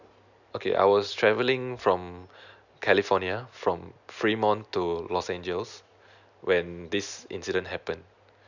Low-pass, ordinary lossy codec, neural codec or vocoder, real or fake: 7.2 kHz; none; none; real